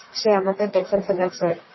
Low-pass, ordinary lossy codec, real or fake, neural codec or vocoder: 7.2 kHz; MP3, 24 kbps; fake; codec, 44.1 kHz, 1.7 kbps, Pupu-Codec